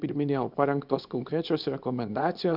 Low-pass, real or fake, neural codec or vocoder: 5.4 kHz; fake; codec, 24 kHz, 0.9 kbps, WavTokenizer, small release